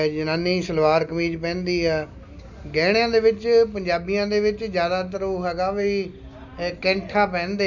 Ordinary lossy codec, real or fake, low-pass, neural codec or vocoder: none; real; 7.2 kHz; none